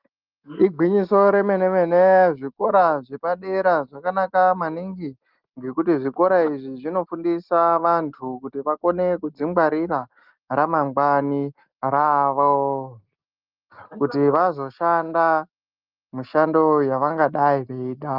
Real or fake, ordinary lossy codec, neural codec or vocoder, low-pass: real; Opus, 32 kbps; none; 5.4 kHz